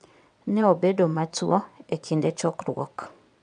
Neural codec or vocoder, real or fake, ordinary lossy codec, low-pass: vocoder, 22.05 kHz, 80 mel bands, WaveNeXt; fake; none; 9.9 kHz